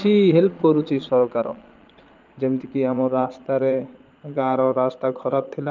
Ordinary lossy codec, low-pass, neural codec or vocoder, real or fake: Opus, 32 kbps; 7.2 kHz; vocoder, 22.05 kHz, 80 mel bands, Vocos; fake